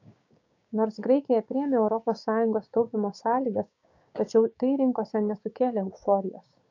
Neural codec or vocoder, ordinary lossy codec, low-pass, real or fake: codec, 16 kHz, 6 kbps, DAC; AAC, 48 kbps; 7.2 kHz; fake